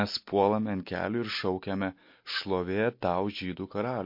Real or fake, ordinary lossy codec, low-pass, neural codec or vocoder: real; MP3, 32 kbps; 5.4 kHz; none